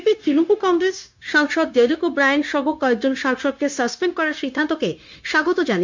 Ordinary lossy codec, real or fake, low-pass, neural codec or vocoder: MP3, 64 kbps; fake; 7.2 kHz; codec, 16 kHz, 0.9 kbps, LongCat-Audio-Codec